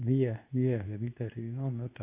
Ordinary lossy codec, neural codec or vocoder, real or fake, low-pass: AAC, 32 kbps; codec, 24 kHz, 0.9 kbps, WavTokenizer, medium speech release version 2; fake; 3.6 kHz